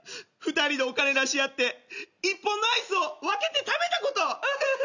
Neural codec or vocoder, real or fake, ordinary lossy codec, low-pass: none; real; none; 7.2 kHz